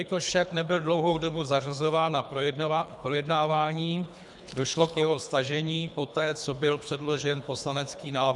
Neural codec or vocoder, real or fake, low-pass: codec, 24 kHz, 3 kbps, HILCodec; fake; 10.8 kHz